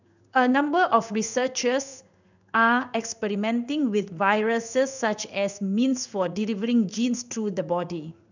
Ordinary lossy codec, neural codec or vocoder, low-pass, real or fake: none; codec, 16 kHz in and 24 kHz out, 1 kbps, XY-Tokenizer; 7.2 kHz; fake